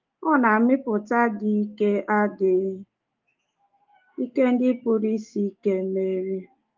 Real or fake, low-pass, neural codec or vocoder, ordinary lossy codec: fake; 7.2 kHz; autoencoder, 48 kHz, 128 numbers a frame, DAC-VAE, trained on Japanese speech; Opus, 32 kbps